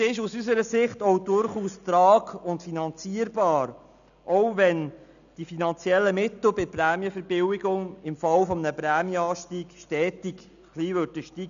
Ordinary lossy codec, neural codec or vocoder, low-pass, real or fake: none; none; 7.2 kHz; real